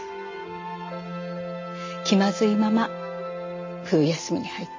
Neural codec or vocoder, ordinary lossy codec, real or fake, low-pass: none; MP3, 32 kbps; real; 7.2 kHz